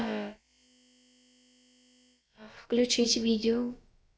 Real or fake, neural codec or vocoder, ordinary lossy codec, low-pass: fake; codec, 16 kHz, about 1 kbps, DyCAST, with the encoder's durations; none; none